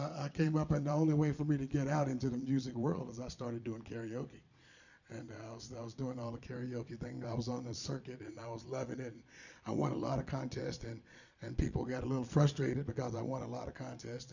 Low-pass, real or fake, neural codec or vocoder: 7.2 kHz; fake; vocoder, 22.05 kHz, 80 mel bands, Vocos